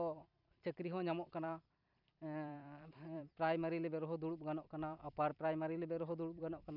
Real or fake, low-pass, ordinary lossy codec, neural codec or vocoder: fake; 5.4 kHz; none; vocoder, 44.1 kHz, 128 mel bands every 256 samples, BigVGAN v2